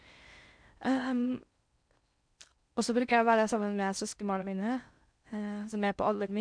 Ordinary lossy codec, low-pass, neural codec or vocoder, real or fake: none; 9.9 kHz; codec, 16 kHz in and 24 kHz out, 0.6 kbps, FocalCodec, streaming, 4096 codes; fake